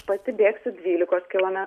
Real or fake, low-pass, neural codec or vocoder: real; 14.4 kHz; none